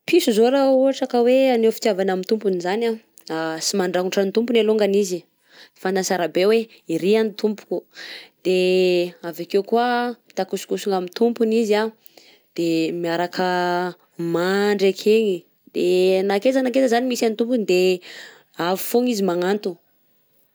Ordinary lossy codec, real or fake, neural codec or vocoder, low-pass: none; real; none; none